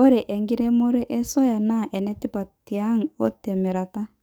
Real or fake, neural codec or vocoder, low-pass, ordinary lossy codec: fake; codec, 44.1 kHz, 7.8 kbps, DAC; none; none